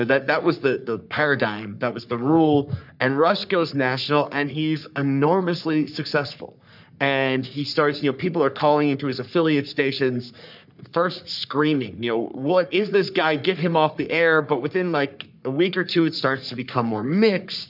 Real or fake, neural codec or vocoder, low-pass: fake; codec, 44.1 kHz, 3.4 kbps, Pupu-Codec; 5.4 kHz